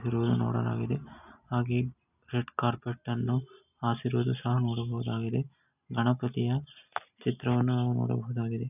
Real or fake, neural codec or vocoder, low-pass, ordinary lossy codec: real; none; 3.6 kHz; none